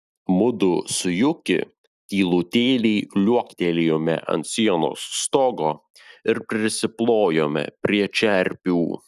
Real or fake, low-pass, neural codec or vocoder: real; 14.4 kHz; none